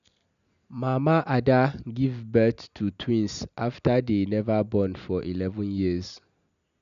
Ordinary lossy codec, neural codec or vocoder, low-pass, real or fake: none; none; 7.2 kHz; real